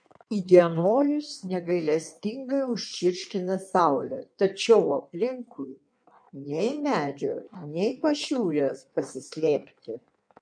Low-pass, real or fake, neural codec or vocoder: 9.9 kHz; fake; codec, 16 kHz in and 24 kHz out, 1.1 kbps, FireRedTTS-2 codec